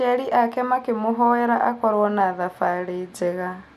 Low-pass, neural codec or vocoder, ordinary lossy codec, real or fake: 14.4 kHz; none; none; real